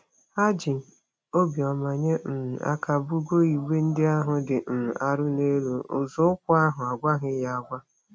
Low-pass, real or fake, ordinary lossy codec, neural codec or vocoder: none; real; none; none